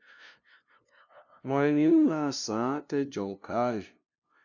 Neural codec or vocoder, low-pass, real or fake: codec, 16 kHz, 0.5 kbps, FunCodec, trained on LibriTTS, 25 frames a second; 7.2 kHz; fake